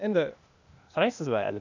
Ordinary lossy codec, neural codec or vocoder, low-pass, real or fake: none; codec, 16 kHz, 0.8 kbps, ZipCodec; 7.2 kHz; fake